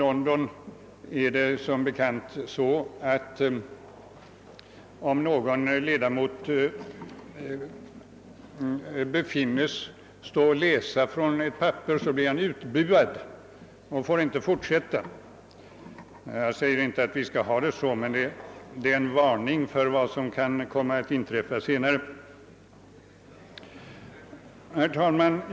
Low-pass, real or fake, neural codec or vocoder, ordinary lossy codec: none; real; none; none